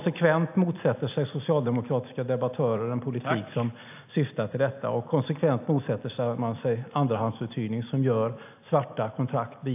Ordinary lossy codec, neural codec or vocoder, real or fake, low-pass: AAC, 32 kbps; none; real; 3.6 kHz